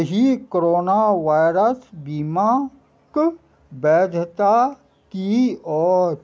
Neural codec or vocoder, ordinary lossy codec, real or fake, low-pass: none; none; real; none